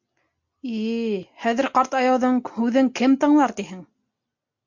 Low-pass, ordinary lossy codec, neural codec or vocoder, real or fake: 7.2 kHz; MP3, 48 kbps; none; real